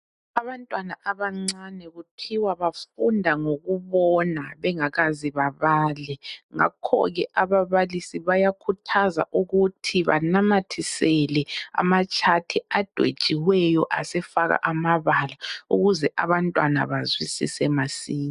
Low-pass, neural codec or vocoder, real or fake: 10.8 kHz; none; real